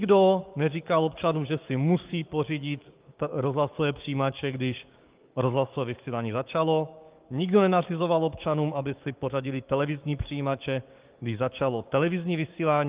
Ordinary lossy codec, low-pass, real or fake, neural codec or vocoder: Opus, 32 kbps; 3.6 kHz; fake; codec, 16 kHz, 6 kbps, DAC